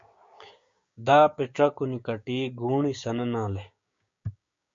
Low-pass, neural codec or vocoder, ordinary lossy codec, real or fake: 7.2 kHz; codec, 16 kHz, 6 kbps, DAC; MP3, 48 kbps; fake